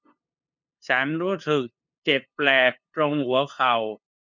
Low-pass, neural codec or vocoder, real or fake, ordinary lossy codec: 7.2 kHz; codec, 16 kHz, 2 kbps, FunCodec, trained on LibriTTS, 25 frames a second; fake; none